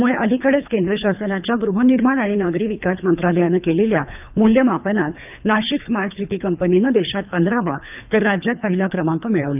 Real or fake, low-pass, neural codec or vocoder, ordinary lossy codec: fake; 3.6 kHz; codec, 24 kHz, 3 kbps, HILCodec; none